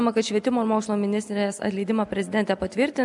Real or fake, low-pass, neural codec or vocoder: real; 10.8 kHz; none